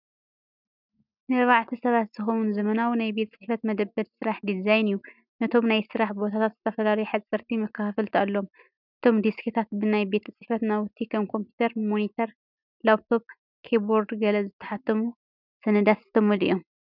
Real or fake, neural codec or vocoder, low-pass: real; none; 5.4 kHz